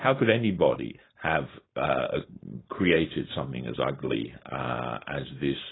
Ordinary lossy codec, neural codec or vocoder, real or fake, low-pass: AAC, 16 kbps; codec, 16 kHz, 4.8 kbps, FACodec; fake; 7.2 kHz